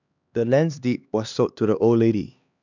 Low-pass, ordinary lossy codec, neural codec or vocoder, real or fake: 7.2 kHz; none; codec, 16 kHz, 2 kbps, X-Codec, HuBERT features, trained on LibriSpeech; fake